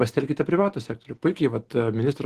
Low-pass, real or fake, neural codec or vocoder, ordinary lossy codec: 14.4 kHz; real; none; Opus, 16 kbps